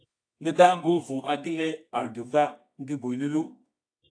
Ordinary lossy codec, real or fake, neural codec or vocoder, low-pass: AAC, 48 kbps; fake; codec, 24 kHz, 0.9 kbps, WavTokenizer, medium music audio release; 9.9 kHz